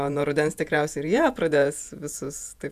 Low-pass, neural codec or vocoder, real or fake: 14.4 kHz; vocoder, 48 kHz, 128 mel bands, Vocos; fake